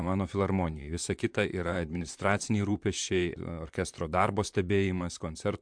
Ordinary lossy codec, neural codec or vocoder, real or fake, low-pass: MP3, 64 kbps; vocoder, 44.1 kHz, 128 mel bands, Pupu-Vocoder; fake; 9.9 kHz